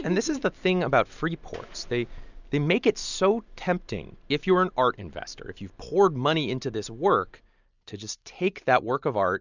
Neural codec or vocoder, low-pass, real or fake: none; 7.2 kHz; real